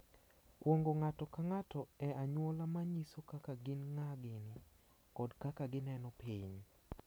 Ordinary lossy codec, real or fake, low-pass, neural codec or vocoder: none; real; none; none